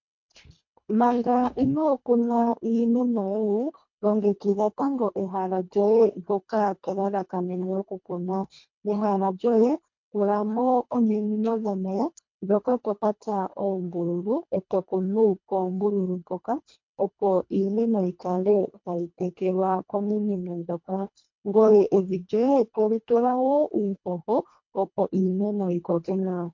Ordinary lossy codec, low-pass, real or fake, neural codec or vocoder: MP3, 48 kbps; 7.2 kHz; fake; codec, 24 kHz, 1.5 kbps, HILCodec